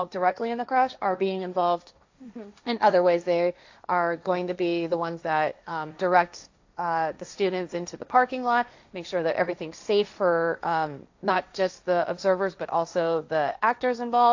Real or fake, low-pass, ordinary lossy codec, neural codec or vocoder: fake; 7.2 kHz; AAC, 48 kbps; codec, 16 kHz, 1.1 kbps, Voila-Tokenizer